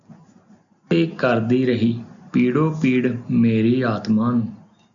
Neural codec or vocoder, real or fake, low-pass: none; real; 7.2 kHz